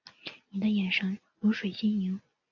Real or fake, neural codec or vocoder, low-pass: real; none; 7.2 kHz